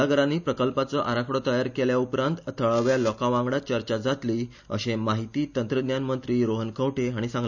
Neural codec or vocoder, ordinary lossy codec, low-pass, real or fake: none; none; 7.2 kHz; real